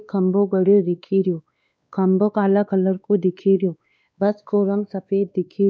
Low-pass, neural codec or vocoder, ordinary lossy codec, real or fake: none; codec, 16 kHz, 2 kbps, X-Codec, WavLM features, trained on Multilingual LibriSpeech; none; fake